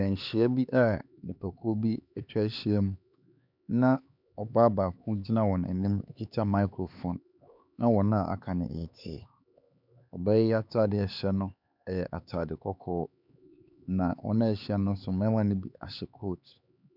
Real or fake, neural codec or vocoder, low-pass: fake; codec, 16 kHz, 4 kbps, X-Codec, HuBERT features, trained on LibriSpeech; 5.4 kHz